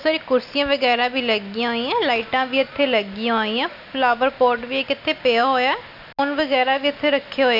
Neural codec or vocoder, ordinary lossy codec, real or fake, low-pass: none; none; real; 5.4 kHz